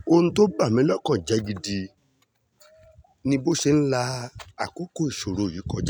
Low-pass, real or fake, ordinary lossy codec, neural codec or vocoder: none; real; none; none